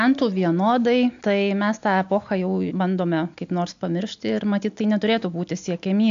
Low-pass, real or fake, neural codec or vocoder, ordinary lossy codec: 7.2 kHz; real; none; AAC, 64 kbps